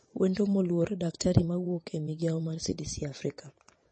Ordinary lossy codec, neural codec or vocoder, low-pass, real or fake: MP3, 32 kbps; vocoder, 22.05 kHz, 80 mel bands, WaveNeXt; 9.9 kHz; fake